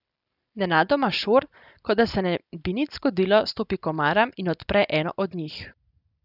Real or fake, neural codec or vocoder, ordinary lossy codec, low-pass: real; none; none; 5.4 kHz